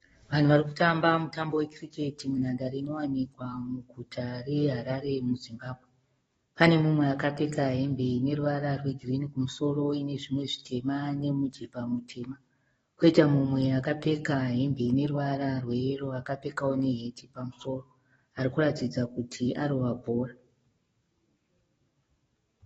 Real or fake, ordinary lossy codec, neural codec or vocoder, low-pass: fake; AAC, 24 kbps; codec, 44.1 kHz, 7.8 kbps, DAC; 19.8 kHz